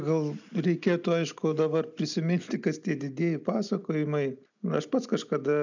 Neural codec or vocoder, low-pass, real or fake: none; 7.2 kHz; real